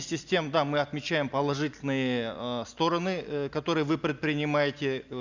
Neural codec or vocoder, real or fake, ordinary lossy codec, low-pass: none; real; Opus, 64 kbps; 7.2 kHz